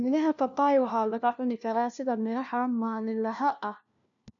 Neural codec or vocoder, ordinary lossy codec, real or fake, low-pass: codec, 16 kHz, 1 kbps, FunCodec, trained on LibriTTS, 50 frames a second; none; fake; 7.2 kHz